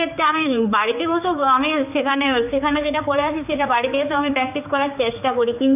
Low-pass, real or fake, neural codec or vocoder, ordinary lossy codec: 3.6 kHz; fake; codec, 16 kHz, 4 kbps, X-Codec, HuBERT features, trained on general audio; none